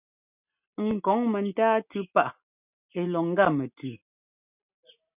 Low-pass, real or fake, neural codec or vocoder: 3.6 kHz; real; none